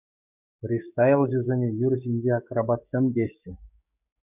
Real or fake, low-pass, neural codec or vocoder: fake; 3.6 kHz; codec, 16 kHz, 6 kbps, DAC